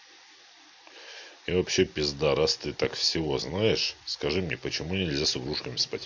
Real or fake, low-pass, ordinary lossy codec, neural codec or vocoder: real; 7.2 kHz; MP3, 64 kbps; none